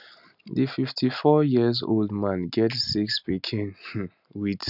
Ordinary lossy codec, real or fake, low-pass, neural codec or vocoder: none; real; 5.4 kHz; none